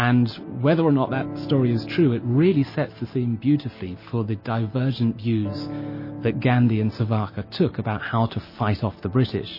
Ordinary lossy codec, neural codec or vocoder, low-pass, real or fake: MP3, 24 kbps; none; 5.4 kHz; real